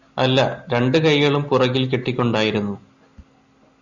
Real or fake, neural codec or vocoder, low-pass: real; none; 7.2 kHz